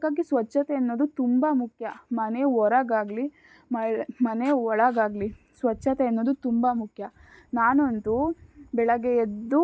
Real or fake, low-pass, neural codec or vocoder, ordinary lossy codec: real; none; none; none